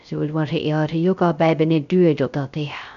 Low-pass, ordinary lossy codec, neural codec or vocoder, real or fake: 7.2 kHz; none; codec, 16 kHz, 0.3 kbps, FocalCodec; fake